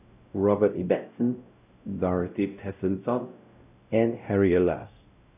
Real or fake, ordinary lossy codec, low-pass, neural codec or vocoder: fake; none; 3.6 kHz; codec, 16 kHz, 0.5 kbps, X-Codec, WavLM features, trained on Multilingual LibriSpeech